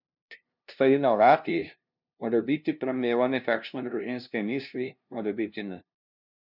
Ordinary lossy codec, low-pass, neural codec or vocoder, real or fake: none; 5.4 kHz; codec, 16 kHz, 0.5 kbps, FunCodec, trained on LibriTTS, 25 frames a second; fake